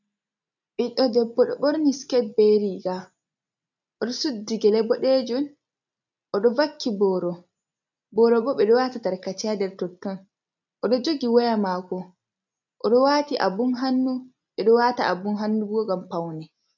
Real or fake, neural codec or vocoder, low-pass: real; none; 7.2 kHz